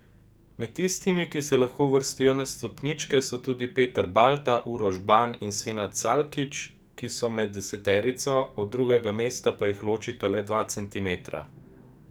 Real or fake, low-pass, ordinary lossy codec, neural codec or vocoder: fake; none; none; codec, 44.1 kHz, 2.6 kbps, SNAC